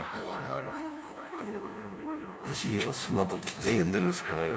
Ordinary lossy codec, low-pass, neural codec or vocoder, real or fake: none; none; codec, 16 kHz, 0.5 kbps, FunCodec, trained on LibriTTS, 25 frames a second; fake